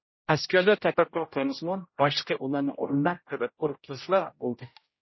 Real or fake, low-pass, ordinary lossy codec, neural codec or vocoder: fake; 7.2 kHz; MP3, 24 kbps; codec, 16 kHz, 0.5 kbps, X-Codec, HuBERT features, trained on general audio